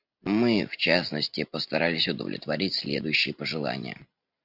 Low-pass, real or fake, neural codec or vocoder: 5.4 kHz; real; none